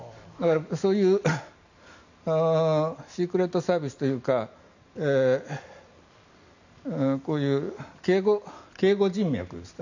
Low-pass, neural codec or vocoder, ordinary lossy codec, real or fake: 7.2 kHz; none; none; real